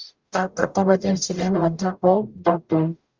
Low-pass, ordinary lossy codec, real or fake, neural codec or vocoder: 7.2 kHz; Opus, 32 kbps; fake; codec, 44.1 kHz, 0.9 kbps, DAC